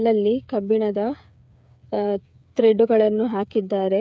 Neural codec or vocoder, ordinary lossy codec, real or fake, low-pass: codec, 16 kHz, 8 kbps, FreqCodec, smaller model; none; fake; none